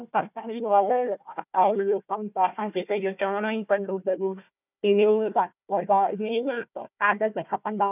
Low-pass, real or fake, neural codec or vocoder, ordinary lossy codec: 3.6 kHz; fake; codec, 16 kHz, 1 kbps, FunCodec, trained on Chinese and English, 50 frames a second; none